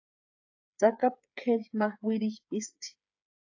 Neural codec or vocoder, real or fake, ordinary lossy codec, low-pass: codec, 16 kHz, 8 kbps, FreqCodec, smaller model; fake; AAC, 48 kbps; 7.2 kHz